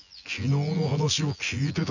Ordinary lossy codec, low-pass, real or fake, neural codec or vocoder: none; 7.2 kHz; fake; vocoder, 24 kHz, 100 mel bands, Vocos